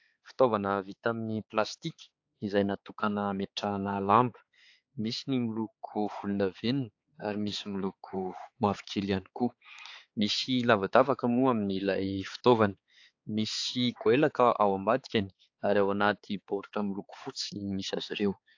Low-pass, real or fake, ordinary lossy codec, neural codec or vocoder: 7.2 kHz; fake; AAC, 48 kbps; codec, 16 kHz, 4 kbps, X-Codec, HuBERT features, trained on balanced general audio